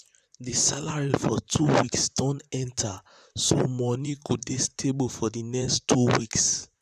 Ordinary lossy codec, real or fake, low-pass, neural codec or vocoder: none; fake; 14.4 kHz; vocoder, 44.1 kHz, 128 mel bands, Pupu-Vocoder